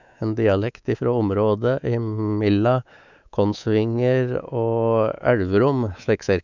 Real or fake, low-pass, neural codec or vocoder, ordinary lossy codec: fake; 7.2 kHz; codec, 24 kHz, 3.1 kbps, DualCodec; none